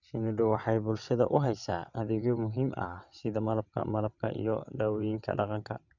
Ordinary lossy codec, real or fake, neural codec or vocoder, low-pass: none; fake; vocoder, 22.05 kHz, 80 mel bands, WaveNeXt; 7.2 kHz